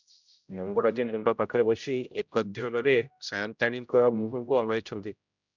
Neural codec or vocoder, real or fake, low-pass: codec, 16 kHz, 0.5 kbps, X-Codec, HuBERT features, trained on general audio; fake; 7.2 kHz